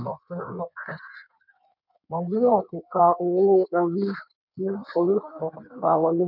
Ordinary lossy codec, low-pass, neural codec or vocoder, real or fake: none; 5.4 kHz; codec, 16 kHz in and 24 kHz out, 1.1 kbps, FireRedTTS-2 codec; fake